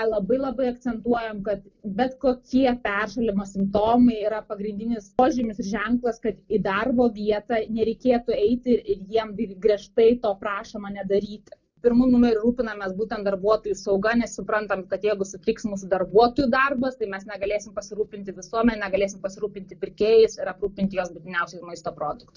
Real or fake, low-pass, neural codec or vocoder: real; 7.2 kHz; none